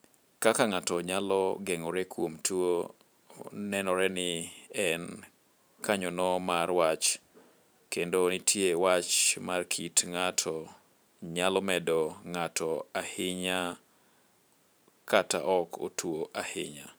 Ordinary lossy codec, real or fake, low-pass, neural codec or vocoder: none; fake; none; vocoder, 44.1 kHz, 128 mel bands every 512 samples, BigVGAN v2